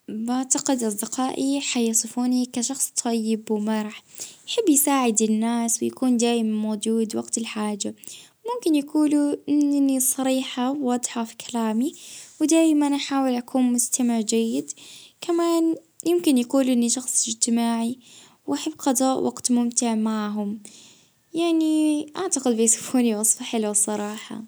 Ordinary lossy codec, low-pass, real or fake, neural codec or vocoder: none; none; real; none